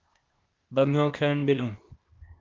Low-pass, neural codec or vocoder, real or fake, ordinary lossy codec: 7.2 kHz; codec, 16 kHz, 0.8 kbps, ZipCodec; fake; Opus, 32 kbps